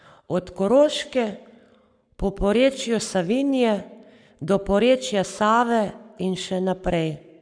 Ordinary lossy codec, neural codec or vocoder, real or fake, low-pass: none; codec, 44.1 kHz, 7.8 kbps, Pupu-Codec; fake; 9.9 kHz